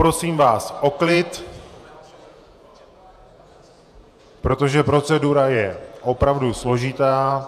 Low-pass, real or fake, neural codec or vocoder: 14.4 kHz; fake; vocoder, 48 kHz, 128 mel bands, Vocos